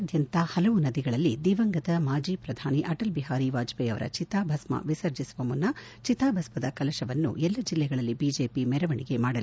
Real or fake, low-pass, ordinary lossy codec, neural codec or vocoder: real; none; none; none